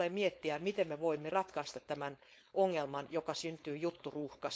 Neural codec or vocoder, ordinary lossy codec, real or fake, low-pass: codec, 16 kHz, 4.8 kbps, FACodec; none; fake; none